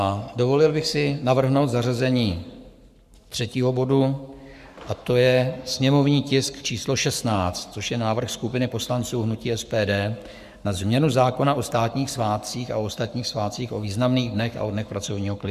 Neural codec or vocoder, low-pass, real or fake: codec, 44.1 kHz, 7.8 kbps, Pupu-Codec; 14.4 kHz; fake